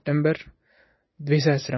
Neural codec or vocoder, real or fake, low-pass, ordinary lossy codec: none; real; 7.2 kHz; MP3, 24 kbps